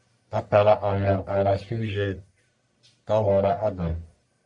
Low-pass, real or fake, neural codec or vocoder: 10.8 kHz; fake; codec, 44.1 kHz, 1.7 kbps, Pupu-Codec